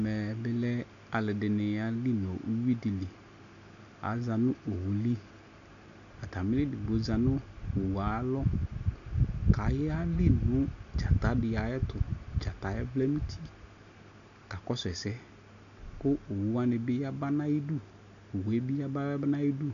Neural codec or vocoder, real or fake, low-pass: none; real; 7.2 kHz